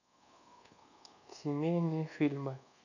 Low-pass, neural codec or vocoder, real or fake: 7.2 kHz; codec, 24 kHz, 1.2 kbps, DualCodec; fake